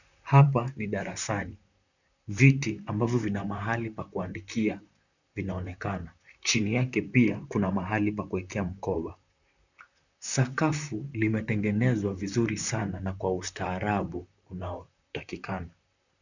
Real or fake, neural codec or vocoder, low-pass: fake; vocoder, 44.1 kHz, 128 mel bands, Pupu-Vocoder; 7.2 kHz